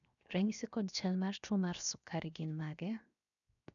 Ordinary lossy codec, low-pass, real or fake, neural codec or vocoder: none; 7.2 kHz; fake; codec, 16 kHz, 0.7 kbps, FocalCodec